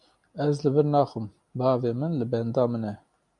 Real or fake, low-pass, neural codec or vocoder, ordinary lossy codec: real; 10.8 kHz; none; MP3, 96 kbps